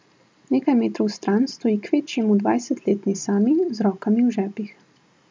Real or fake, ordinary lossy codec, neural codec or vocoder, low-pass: real; none; none; none